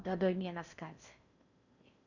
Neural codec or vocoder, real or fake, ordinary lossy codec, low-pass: codec, 16 kHz in and 24 kHz out, 0.6 kbps, FocalCodec, streaming, 4096 codes; fake; none; 7.2 kHz